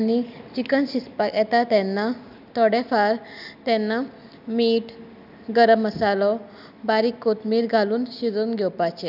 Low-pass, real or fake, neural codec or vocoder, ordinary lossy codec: 5.4 kHz; real; none; none